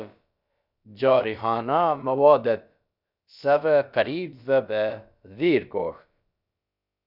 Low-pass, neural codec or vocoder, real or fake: 5.4 kHz; codec, 16 kHz, about 1 kbps, DyCAST, with the encoder's durations; fake